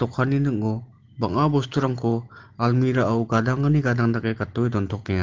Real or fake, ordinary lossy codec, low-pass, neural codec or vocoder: real; Opus, 16 kbps; 7.2 kHz; none